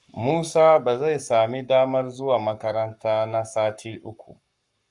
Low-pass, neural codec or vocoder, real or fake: 10.8 kHz; codec, 44.1 kHz, 7.8 kbps, Pupu-Codec; fake